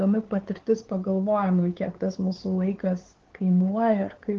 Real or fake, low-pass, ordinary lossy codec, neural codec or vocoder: fake; 7.2 kHz; Opus, 16 kbps; codec, 16 kHz, 4 kbps, X-Codec, WavLM features, trained on Multilingual LibriSpeech